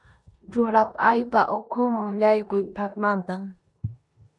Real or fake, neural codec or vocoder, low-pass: fake; codec, 16 kHz in and 24 kHz out, 0.9 kbps, LongCat-Audio-Codec, four codebook decoder; 10.8 kHz